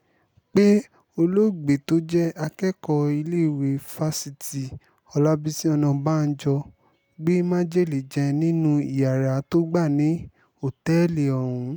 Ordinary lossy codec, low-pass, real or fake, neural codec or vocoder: none; none; real; none